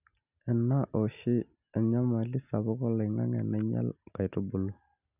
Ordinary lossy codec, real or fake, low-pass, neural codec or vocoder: none; real; 3.6 kHz; none